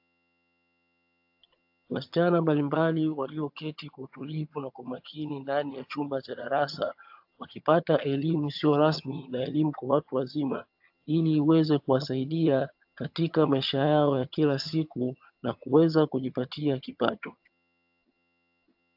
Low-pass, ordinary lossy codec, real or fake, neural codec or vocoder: 5.4 kHz; AAC, 48 kbps; fake; vocoder, 22.05 kHz, 80 mel bands, HiFi-GAN